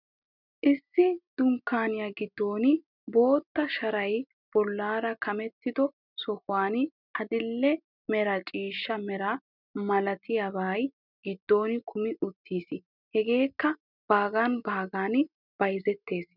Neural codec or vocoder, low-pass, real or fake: none; 5.4 kHz; real